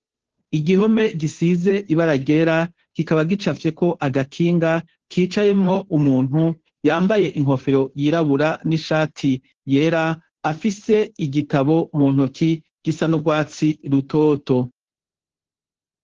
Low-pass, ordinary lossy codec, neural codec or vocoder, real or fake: 7.2 kHz; Opus, 16 kbps; codec, 16 kHz, 2 kbps, FunCodec, trained on Chinese and English, 25 frames a second; fake